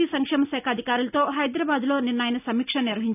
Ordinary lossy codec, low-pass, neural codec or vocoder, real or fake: none; 3.6 kHz; none; real